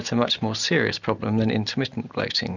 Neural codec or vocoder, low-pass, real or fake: none; 7.2 kHz; real